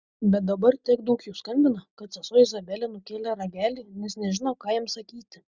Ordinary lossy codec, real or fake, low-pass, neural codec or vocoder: Opus, 64 kbps; real; 7.2 kHz; none